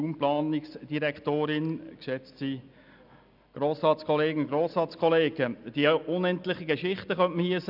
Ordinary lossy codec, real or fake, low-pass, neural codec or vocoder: none; real; 5.4 kHz; none